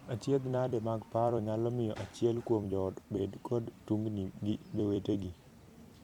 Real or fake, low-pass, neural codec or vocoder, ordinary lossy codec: fake; 19.8 kHz; vocoder, 44.1 kHz, 128 mel bands every 256 samples, BigVGAN v2; MP3, 96 kbps